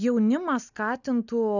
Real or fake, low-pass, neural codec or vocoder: real; 7.2 kHz; none